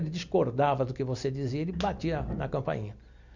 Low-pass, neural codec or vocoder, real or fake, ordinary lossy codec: 7.2 kHz; none; real; none